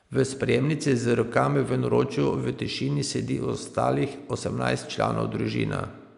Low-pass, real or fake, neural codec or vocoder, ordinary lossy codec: 10.8 kHz; real; none; none